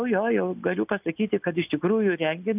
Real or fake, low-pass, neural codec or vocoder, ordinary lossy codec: real; 3.6 kHz; none; AAC, 32 kbps